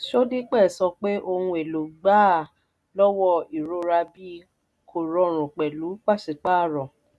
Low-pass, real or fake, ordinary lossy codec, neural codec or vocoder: none; real; none; none